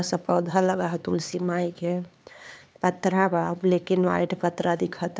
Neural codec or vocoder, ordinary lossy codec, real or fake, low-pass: codec, 16 kHz, 4 kbps, X-Codec, HuBERT features, trained on LibriSpeech; none; fake; none